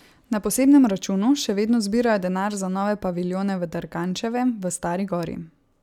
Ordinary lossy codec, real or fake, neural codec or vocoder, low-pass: none; real; none; 19.8 kHz